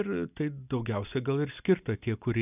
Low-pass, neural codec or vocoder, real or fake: 3.6 kHz; none; real